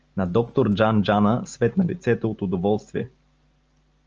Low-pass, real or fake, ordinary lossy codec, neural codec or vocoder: 7.2 kHz; real; Opus, 24 kbps; none